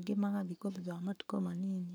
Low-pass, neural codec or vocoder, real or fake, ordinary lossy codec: none; codec, 44.1 kHz, 7.8 kbps, Pupu-Codec; fake; none